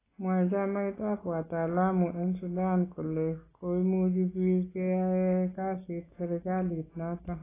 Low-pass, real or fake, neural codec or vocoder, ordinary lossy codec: 3.6 kHz; real; none; AAC, 16 kbps